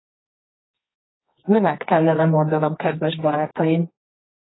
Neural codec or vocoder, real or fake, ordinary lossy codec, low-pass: codec, 24 kHz, 0.9 kbps, WavTokenizer, medium music audio release; fake; AAC, 16 kbps; 7.2 kHz